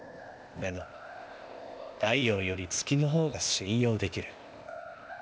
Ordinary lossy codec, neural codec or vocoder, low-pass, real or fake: none; codec, 16 kHz, 0.8 kbps, ZipCodec; none; fake